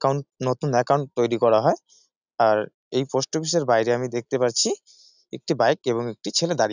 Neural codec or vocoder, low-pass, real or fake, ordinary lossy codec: none; 7.2 kHz; real; none